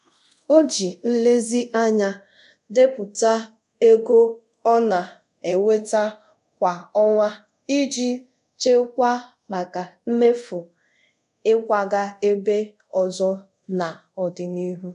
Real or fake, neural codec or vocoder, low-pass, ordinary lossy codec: fake; codec, 24 kHz, 0.5 kbps, DualCodec; 10.8 kHz; none